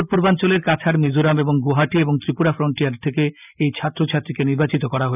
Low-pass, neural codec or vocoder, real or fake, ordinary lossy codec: 3.6 kHz; none; real; none